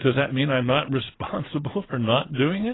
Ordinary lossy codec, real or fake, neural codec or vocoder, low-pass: AAC, 16 kbps; fake; vocoder, 22.05 kHz, 80 mel bands, Vocos; 7.2 kHz